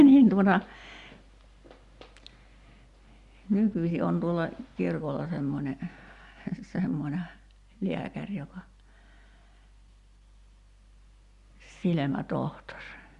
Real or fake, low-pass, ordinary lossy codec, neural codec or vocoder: real; 10.8 kHz; Opus, 64 kbps; none